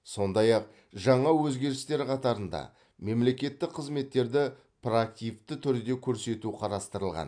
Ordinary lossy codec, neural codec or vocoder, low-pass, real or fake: AAC, 64 kbps; none; 9.9 kHz; real